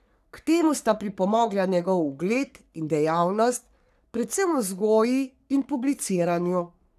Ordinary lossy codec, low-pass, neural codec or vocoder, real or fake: none; 14.4 kHz; codec, 44.1 kHz, 3.4 kbps, Pupu-Codec; fake